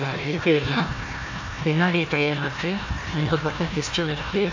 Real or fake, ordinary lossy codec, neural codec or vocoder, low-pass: fake; AAC, 48 kbps; codec, 16 kHz, 1 kbps, FunCodec, trained on Chinese and English, 50 frames a second; 7.2 kHz